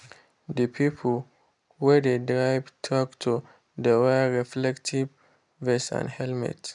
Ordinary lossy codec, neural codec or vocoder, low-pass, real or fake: none; none; 10.8 kHz; real